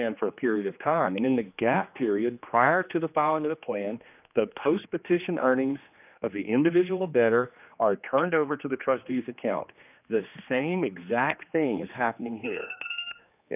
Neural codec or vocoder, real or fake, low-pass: codec, 16 kHz, 2 kbps, X-Codec, HuBERT features, trained on general audio; fake; 3.6 kHz